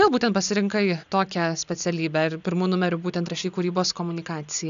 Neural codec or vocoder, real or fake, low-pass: none; real; 7.2 kHz